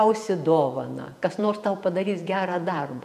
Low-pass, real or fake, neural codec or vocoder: 14.4 kHz; fake; vocoder, 48 kHz, 128 mel bands, Vocos